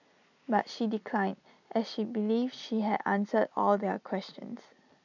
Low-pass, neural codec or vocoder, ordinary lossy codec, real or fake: 7.2 kHz; none; none; real